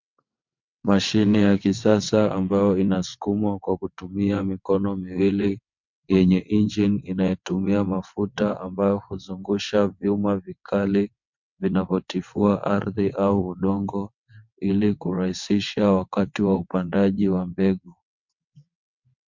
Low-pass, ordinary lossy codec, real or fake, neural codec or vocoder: 7.2 kHz; MP3, 64 kbps; fake; vocoder, 22.05 kHz, 80 mel bands, WaveNeXt